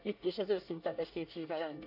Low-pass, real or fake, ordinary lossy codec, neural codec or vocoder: 5.4 kHz; fake; none; codec, 24 kHz, 1 kbps, SNAC